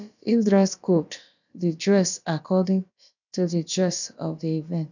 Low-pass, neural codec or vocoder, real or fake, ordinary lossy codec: 7.2 kHz; codec, 16 kHz, about 1 kbps, DyCAST, with the encoder's durations; fake; none